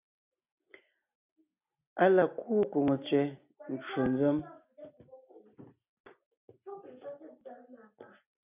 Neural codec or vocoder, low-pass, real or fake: none; 3.6 kHz; real